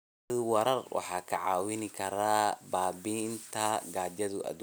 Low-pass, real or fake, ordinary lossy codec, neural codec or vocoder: none; real; none; none